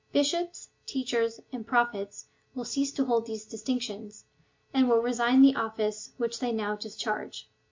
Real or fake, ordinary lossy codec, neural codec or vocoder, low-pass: real; MP3, 48 kbps; none; 7.2 kHz